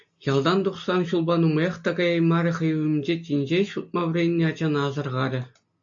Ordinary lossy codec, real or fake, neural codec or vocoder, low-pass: AAC, 64 kbps; real; none; 7.2 kHz